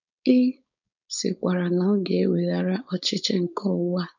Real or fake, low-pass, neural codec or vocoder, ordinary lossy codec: fake; 7.2 kHz; codec, 16 kHz, 4.8 kbps, FACodec; none